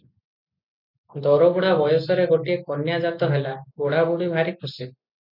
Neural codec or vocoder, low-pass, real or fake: none; 5.4 kHz; real